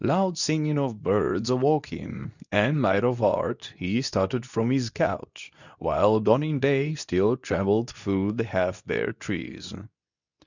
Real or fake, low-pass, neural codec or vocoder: fake; 7.2 kHz; codec, 24 kHz, 0.9 kbps, WavTokenizer, medium speech release version 2